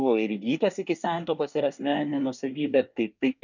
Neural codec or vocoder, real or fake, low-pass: codec, 24 kHz, 1 kbps, SNAC; fake; 7.2 kHz